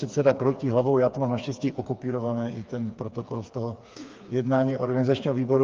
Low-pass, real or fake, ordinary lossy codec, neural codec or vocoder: 7.2 kHz; fake; Opus, 24 kbps; codec, 16 kHz, 4 kbps, FreqCodec, smaller model